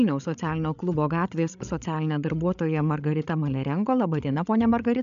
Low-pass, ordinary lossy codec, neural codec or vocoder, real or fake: 7.2 kHz; AAC, 96 kbps; codec, 16 kHz, 16 kbps, FreqCodec, larger model; fake